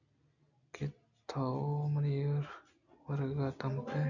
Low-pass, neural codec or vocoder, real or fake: 7.2 kHz; none; real